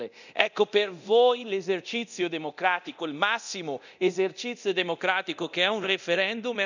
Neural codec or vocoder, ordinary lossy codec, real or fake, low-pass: codec, 24 kHz, 0.9 kbps, DualCodec; none; fake; 7.2 kHz